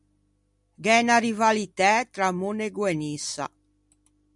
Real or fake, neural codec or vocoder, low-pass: real; none; 10.8 kHz